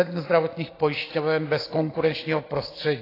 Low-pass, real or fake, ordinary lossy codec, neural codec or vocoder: 5.4 kHz; real; AAC, 24 kbps; none